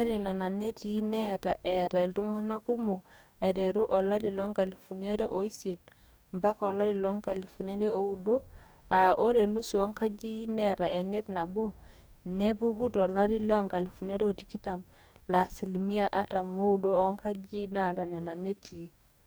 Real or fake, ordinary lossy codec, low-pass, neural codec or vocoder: fake; none; none; codec, 44.1 kHz, 2.6 kbps, DAC